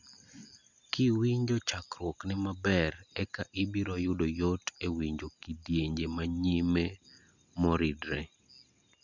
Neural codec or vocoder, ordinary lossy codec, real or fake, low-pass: none; none; real; 7.2 kHz